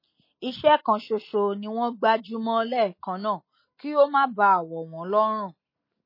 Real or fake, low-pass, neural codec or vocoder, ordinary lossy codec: real; 5.4 kHz; none; MP3, 24 kbps